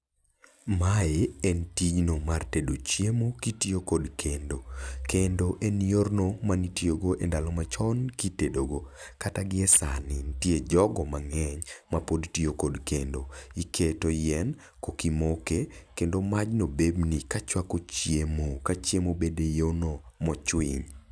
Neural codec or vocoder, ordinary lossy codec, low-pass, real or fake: none; none; none; real